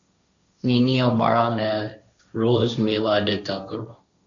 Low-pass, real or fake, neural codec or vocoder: 7.2 kHz; fake; codec, 16 kHz, 1.1 kbps, Voila-Tokenizer